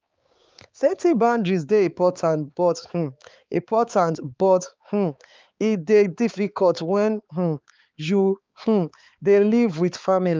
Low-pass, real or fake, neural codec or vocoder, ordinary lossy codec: 7.2 kHz; fake; codec, 16 kHz, 4 kbps, X-Codec, HuBERT features, trained on balanced general audio; Opus, 24 kbps